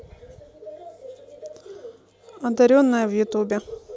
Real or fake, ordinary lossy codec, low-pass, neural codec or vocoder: real; none; none; none